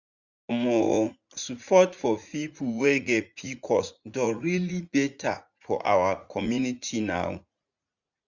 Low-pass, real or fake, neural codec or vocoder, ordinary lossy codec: 7.2 kHz; fake; vocoder, 44.1 kHz, 80 mel bands, Vocos; none